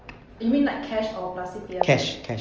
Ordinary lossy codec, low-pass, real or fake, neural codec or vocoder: Opus, 24 kbps; 7.2 kHz; real; none